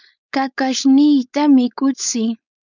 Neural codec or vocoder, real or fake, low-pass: codec, 16 kHz, 4.8 kbps, FACodec; fake; 7.2 kHz